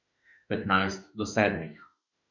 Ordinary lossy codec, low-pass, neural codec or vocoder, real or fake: none; 7.2 kHz; autoencoder, 48 kHz, 32 numbers a frame, DAC-VAE, trained on Japanese speech; fake